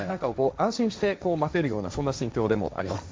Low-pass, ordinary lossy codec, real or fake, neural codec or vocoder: none; none; fake; codec, 16 kHz, 1.1 kbps, Voila-Tokenizer